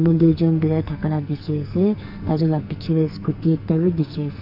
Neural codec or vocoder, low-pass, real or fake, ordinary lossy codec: codec, 44.1 kHz, 2.6 kbps, SNAC; 5.4 kHz; fake; none